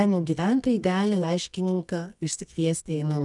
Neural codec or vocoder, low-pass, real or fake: codec, 24 kHz, 0.9 kbps, WavTokenizer, medium music audio release; 10.8 kHz; fake